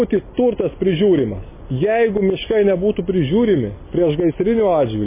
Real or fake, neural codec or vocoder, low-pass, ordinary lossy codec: real; none; 3.6 kHz; MP3, 16 kbps